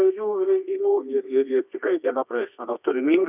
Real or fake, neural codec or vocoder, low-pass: fake; codec, 24 kHz, 0.9 kbps, WavTokenizer, medium music audio release; 3.6 kHz